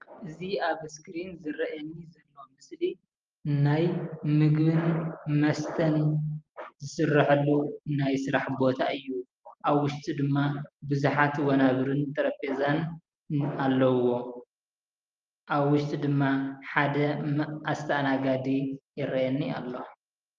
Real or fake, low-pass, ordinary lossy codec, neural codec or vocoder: real; 7.2 kHz; Opus, 32 kbps; none